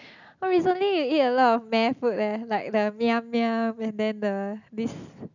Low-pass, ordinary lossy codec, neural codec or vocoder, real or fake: 7.2 kHz; none; none; real